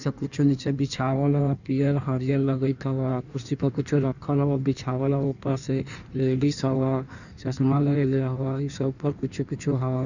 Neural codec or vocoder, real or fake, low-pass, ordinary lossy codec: codec, 16 kHz in and 24 kHz out, 1.1 kbps, FireRedTTS-2 codec; fake; 7.2 kHz; none